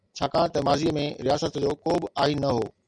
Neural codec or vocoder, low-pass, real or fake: none; 9.9 kHz; real